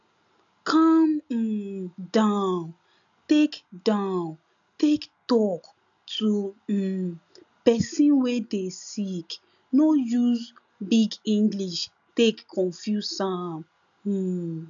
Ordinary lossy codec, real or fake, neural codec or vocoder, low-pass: none; real; none; 7.2 kHz